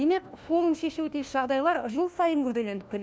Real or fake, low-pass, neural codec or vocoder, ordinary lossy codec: fake; none; codec, 16 kHz, 1 kbps, FunCodec, trained on LibriTTS, 50 frames a second; none